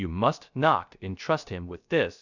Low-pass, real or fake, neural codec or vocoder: 7.2 kHz; fake; codec, 16 kHz, 0.3 kbps, FocalCodec